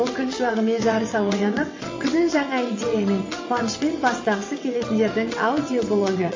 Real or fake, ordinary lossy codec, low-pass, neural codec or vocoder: fake; MP3, 32 kbps; 7.2 kHz; vocoder, 22.05 kHz, 80 mel bands, WaveNeXt